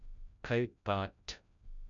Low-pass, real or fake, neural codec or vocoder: 7.2 kHz; fake; codec, 16 kHz, 0.5 kbps, FreqCodec, larger model